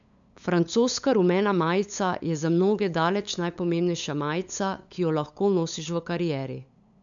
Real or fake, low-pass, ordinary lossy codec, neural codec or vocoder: fake; 7.2 kHz; none; codec, 16 kHz, 8 kbps, FunCodec, trained on LibriTTS, 25 frames a second